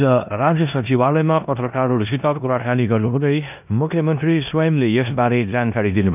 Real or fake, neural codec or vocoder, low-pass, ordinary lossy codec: fake; codec, 16 kHz in and 24 kHz out, 0.9 kbps, LongCat-Audio-Codec, four codebook decoder; 3.6 kHz; none